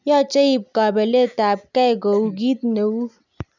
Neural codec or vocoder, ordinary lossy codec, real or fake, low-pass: none; none; real; 7.2 kHz